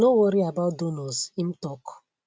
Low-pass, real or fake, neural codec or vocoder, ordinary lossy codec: none; real; none; none